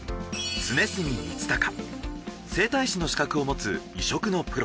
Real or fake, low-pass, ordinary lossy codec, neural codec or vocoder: real; none; none; none